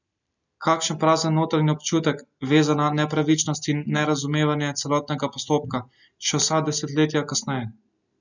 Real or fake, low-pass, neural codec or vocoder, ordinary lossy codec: real; 7.2 kHz; none; none